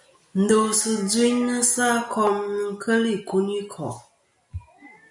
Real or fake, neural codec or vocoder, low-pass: real; none; 10.8 kHz